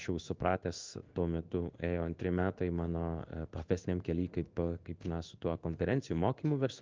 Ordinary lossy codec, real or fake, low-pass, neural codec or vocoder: Opus, 24 kbps; fake; 7.2 kHz; codec, 16 kHz in and 24 kHz out, 1 kbps, XY-Tokenizer